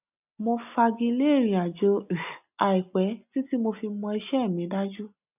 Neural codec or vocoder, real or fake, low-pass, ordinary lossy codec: none; real; 3.6 kHz; none